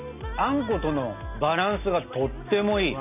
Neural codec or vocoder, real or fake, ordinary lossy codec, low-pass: none; real; none; 3.6 kHz